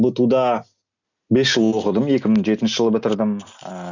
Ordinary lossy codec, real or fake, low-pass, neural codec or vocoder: none; real; 7.2 kHz; none